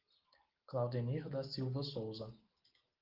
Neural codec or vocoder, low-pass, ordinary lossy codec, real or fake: none; 5.4 kHz; Opus, 16 kbps; real